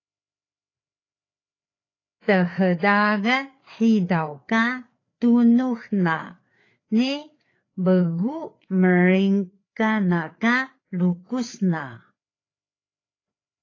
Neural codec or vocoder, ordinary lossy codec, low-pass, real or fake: codec, 16 kHz, 4 kbps, FreqCodec, larger model; AAC, 32 kbps; 7.2 kHz; fake